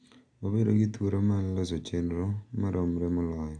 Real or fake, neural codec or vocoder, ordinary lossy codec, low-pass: real; none; AAC, 64 kbps; 9.9 kHz